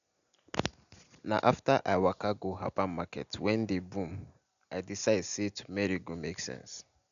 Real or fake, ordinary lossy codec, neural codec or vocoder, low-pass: real; none; none; 7.2 kHz